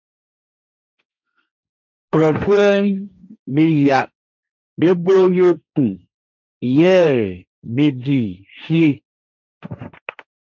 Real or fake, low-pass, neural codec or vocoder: fake; 7.2 kHz; codec, 16 kHz, 1.1 kbps, Voila-Tokenizer